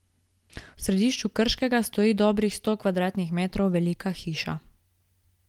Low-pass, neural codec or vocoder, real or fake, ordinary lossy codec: 19.8 kHz; none; real; Opus, 24 kbps